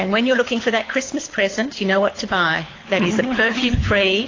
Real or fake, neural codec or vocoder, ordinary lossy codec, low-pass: fake; codec, 24 kHz, 6 kbps, HILCodec; AAC, 32 kbps; 7.2 kHz